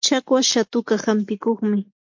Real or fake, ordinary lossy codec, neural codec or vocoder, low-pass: real; MP3, 48 kbps; none; 7.2 kHz